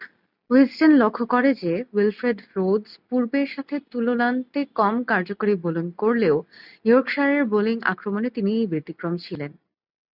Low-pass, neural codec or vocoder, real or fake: 5.4 kHz; none; real